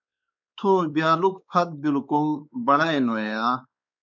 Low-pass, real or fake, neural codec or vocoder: 7.2 kHz; fake; codec, 16 kHz, 4 kbps, X-Codec, WavLM features, trained on Multilingual LibriSpeech